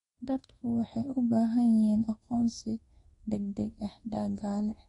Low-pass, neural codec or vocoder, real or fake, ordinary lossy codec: 10.8 kHz; codec, 24 kHz, 1.2 kbps, DualCodec; fake; AAC, 32 kbps